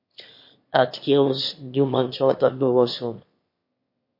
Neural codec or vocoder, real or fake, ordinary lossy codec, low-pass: autoencoder, 22.05 kHz, a latent of 192 numbers a frame, VITS, trained on one speaker; fake; MP3, 32 kbps; 5.4 kHz